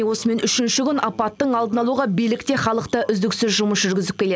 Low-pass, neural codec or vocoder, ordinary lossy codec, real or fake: none; none; none; real